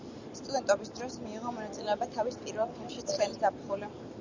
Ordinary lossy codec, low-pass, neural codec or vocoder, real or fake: Opus, 64 kbps; 7.2 kHz; none; real